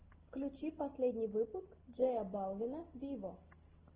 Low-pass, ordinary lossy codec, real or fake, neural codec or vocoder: 3.6 kHz; Opus, 16 kbps; real; none